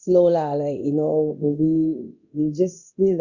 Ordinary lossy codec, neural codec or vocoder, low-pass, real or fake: Opus, 64 kbps; codec, 24 kHz, 0.9 kbps, DualCodec; 7.2 kHz; fake